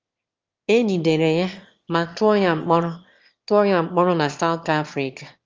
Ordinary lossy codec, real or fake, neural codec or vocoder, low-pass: Opus, 32 kbps; fake; autoencoder, 22.05 kHz, a latent of 192 numbers a frame, VITS, trained on one speaker; 7.2 kHz